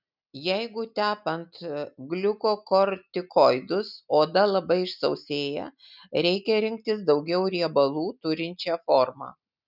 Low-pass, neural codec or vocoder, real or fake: 5.4 kHz; none; real